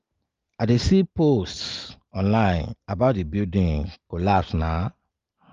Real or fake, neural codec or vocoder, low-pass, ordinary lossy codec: real; none; 7.2 kHz; Opus, 32 kbps